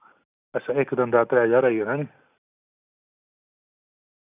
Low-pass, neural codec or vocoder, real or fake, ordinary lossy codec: 3.6 kHz; none; real; none